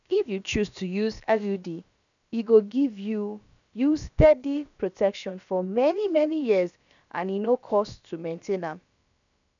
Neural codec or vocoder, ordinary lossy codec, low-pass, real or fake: codec, 16 kHz, about 1 kbps, DyCAST, with the encoder's durations; none; 7.2 kHz; fake